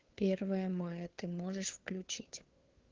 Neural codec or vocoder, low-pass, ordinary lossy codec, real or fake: codec, 16 kHz, 2 kbps, FunCodec, trained on Chinese and English, 25 frames a second; 7.2 kHz; Opus, 16 kbps; fake